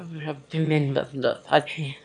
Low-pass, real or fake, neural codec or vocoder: 9.9 kHz; fake; autoencoder, 22.05 kHz, a latent of 192 numbers a frame, VITS, trained on one speaker